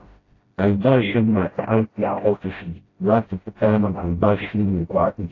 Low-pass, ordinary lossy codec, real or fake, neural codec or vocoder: 7.2 kHz; AAC, 32 kbps; fake; codec, 16 kHz, 0.5 kbps, FreqCodec, smaller model